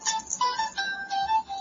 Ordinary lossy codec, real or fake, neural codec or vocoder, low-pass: MP3, 32 kbps; real; none; 7.2 kHz